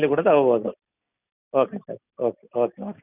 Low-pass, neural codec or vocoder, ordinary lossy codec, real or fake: 3.6 kHz; none; none; real